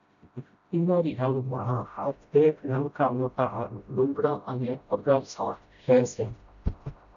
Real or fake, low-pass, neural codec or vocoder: fake; 7.2 kHz; codec, 16 kHz, 0.5 kbps, FreqCodec, smaller model